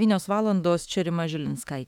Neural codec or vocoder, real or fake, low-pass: autoencoder, 48 kHz, 32 numbers a frame, DAC-VAE, trained on Japanese speech; fake; 19.8 kHz